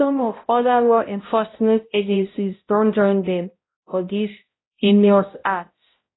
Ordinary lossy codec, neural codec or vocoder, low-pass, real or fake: AAC, 16 kbps; codec, 16 kHz, 0.5 kbps, X-Codec, HuBERT features, trained on balanced general audio; 7.2 kHz; fake